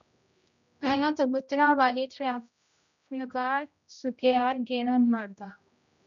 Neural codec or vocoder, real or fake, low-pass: codec, 16 kHz, 0.5 kbps, X-Codec, HuBERT features, trained on general audio; fake; 7.2 kHz